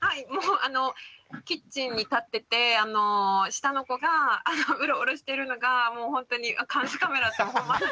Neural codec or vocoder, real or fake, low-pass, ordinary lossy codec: none; real; none; none